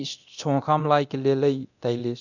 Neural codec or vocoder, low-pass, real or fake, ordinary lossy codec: codec, 24 kHz, 0.9 kbps, DualCodec; 7.2 kHz; fake; none